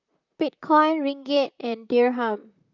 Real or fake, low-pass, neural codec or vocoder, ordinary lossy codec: fake; 7.2 kHz; vocoder, 44.1 kHz, 128 mel bands, Pupu-Vocoder; none